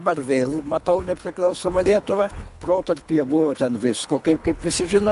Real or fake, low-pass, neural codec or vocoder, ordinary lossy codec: fake; 10.8 kHz; codec, 24 kHz, 3 kbps, HILCodec; AAC, 96 kbps